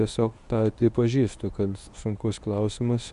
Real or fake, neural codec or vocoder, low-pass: fake; codec, 24 kHz, 0.9 kbps, WavTokenizer, medium speech release version 1; 10.8 kHz